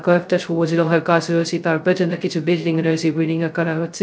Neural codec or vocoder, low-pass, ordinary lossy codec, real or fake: codec, 16 kHz, 0.2 kbps, FocalCodec; none; none; fake